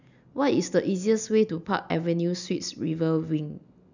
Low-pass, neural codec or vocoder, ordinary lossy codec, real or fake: 7.2 kHz; none; none; real